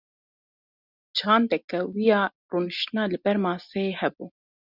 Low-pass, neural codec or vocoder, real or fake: 5.4 kHz; none; real